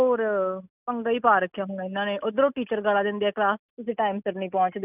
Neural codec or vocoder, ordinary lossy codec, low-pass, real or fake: none; none; 3.6 kHz; real